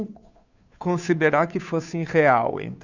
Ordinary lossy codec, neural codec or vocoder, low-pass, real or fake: none; codec, 16 kHz, 2 kbps, FunCodec, trained on Chinese and English, 25 frames a second; 7.2 kHz; fake